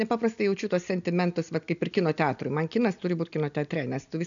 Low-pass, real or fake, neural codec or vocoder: 7.2 kHz; real; none